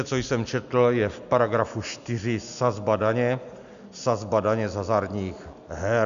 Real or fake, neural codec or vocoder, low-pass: real; none; 7.2 kHz